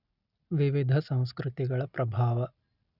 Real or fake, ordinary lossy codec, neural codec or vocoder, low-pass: real; none; none; 5.4 kHz